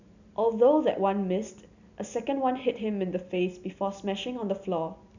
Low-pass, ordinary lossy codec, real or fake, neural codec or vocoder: 7.2 kHz; none; real; none